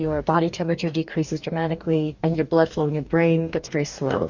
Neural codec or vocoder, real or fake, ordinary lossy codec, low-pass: codec, 44.1 kHz, 2.6 kbps, DAC; fake; Opus, 64 kbps; 7.2 kHz